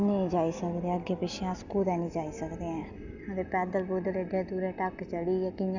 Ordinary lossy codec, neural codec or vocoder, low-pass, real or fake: none; none; 7.2 kHz; real